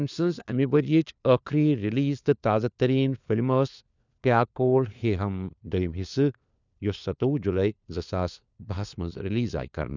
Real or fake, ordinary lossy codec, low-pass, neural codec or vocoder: fake; none; 7.2 kHz; codec, 16 kHz, 4 kbps, FunCodec, trained on LibriTTS, 50 frames a second